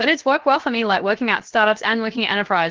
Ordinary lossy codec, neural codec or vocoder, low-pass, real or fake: Opus, 16 kbps; codec, 16 kHz, 0.7 kbps, FocalCodec; 7.2 kHz; fake